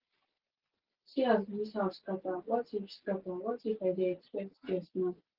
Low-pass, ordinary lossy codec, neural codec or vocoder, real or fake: 5.4 kHz; Opus, 16 kbps; none; real